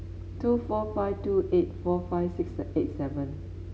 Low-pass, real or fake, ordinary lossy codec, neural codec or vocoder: none; real; none; none